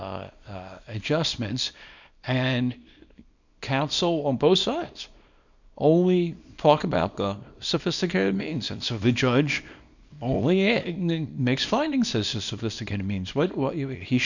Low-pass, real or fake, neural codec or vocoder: 7.2 kHz; fake; codec, 24 kHz, 0.9 kbps, WavTokenizer, small release